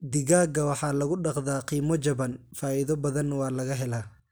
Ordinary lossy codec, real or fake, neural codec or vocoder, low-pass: none; real; none; none